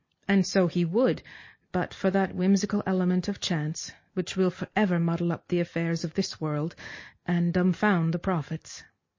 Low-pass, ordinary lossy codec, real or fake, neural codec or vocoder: 7.2 kHz; MP3, 32 kbps; real; none